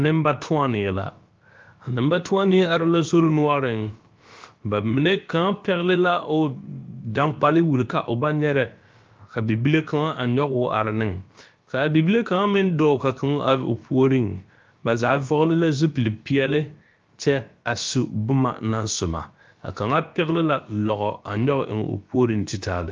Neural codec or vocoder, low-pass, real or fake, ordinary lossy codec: codec, 16 kHz, about 1 kbps, DyCAST, with the encoder's durations; 7.2 kHz; fake; Opus, 24 kbps